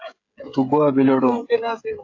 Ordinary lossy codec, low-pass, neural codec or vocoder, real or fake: AAC, 48 kbps; 7.2 kHz; codec, 16 kHz, 16 kbps, FreqCodec, smaller model; fake